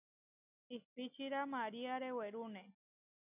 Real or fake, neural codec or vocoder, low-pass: real; none; 3.6 kHz